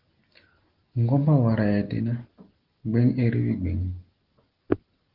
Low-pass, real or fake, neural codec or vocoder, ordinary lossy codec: 5.4 kHz; real; none; Opus, 16 kbps